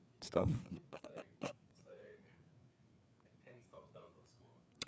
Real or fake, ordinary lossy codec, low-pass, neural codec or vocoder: fake; none; none; codec, 16 kHz, 4 kbps, FreqCodec, larger model